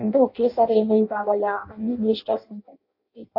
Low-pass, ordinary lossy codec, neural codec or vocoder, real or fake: 5.4 kHz; AAC, 32 kbps; codec, 16 kHz in and 24 kHz out, 0.6 kbps, FireRedTTS-2 codec; fake